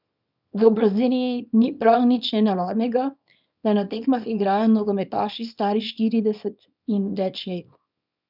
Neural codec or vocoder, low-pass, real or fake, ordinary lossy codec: codec, 24 kHz, 0.9 kbps, WavTokenizer, small release; 5.4 kHz; fake; none